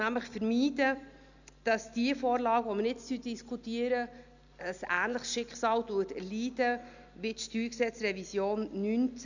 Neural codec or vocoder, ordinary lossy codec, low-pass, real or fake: none; none; 7.2 kHz; real